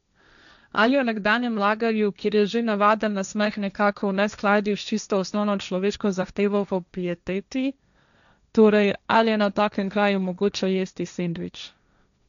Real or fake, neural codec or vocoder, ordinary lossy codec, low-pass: fake; codec, 16 kHz, 1.1 kbps, Voila-Tokenizer; none; 7.2 kHz